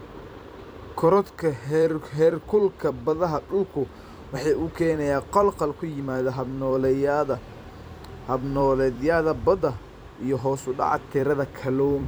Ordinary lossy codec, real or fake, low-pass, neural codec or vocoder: none; fake; none; vocoder, 44.1 kHz, 128 mel bands every 256 samples, BigVGAN v2